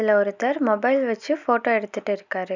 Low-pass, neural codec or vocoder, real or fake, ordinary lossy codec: 7.2 kHz; none; real; none